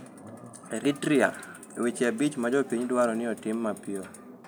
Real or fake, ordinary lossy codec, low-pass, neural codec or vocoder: real; none; none; none